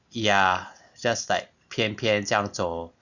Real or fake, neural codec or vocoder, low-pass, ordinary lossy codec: real; none; 7.2 kHz; none